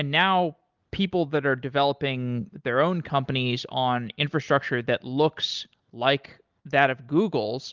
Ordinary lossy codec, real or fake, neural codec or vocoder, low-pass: Opus, 24 kbps; real; none; 7.2 kHz